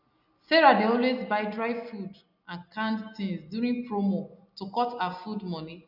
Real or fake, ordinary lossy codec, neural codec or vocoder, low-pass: real; none; none; 5.4 kHz